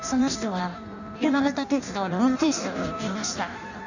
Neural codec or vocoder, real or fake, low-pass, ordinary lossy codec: codec, 16 kHz in and 24 kHz out, 0.6 kbps, FireRedTTS-2 codec; fake; 7.2 kHz; none